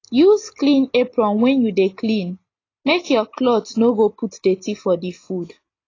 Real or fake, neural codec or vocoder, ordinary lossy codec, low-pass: real; none; AAC, 32 kbps; 7.2 kHz